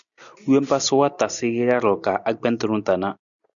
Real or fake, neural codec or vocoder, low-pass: real; none; 7.2 kHz